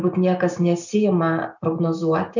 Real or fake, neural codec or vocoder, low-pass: real; none; 7.2 kHz